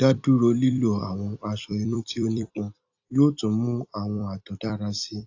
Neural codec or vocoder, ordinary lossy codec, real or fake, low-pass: vocoder, 44.1 kHz, 128 mel bands every 256 samples, BigVGAN v2; AAC, 48 kbps; fake; 7.2 kHz